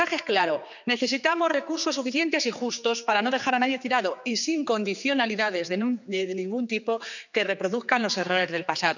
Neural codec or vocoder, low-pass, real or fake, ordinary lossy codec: codec, 16 kHz, 4 kbps, X-Codec, HuBERT features, trained on general audio; 7.2 kHz; fake; none